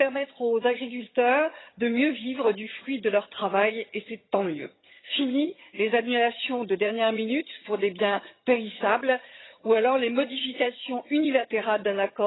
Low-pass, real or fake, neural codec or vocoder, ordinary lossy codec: 7.2 kHz; fake; vocoder, 22.05 kHz, 80 mel bands, HiFi-GAN; AAC, 16 kbps